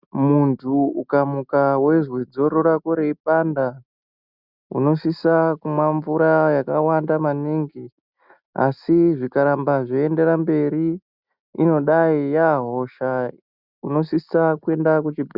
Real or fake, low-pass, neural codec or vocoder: real; 5.4 kHz; none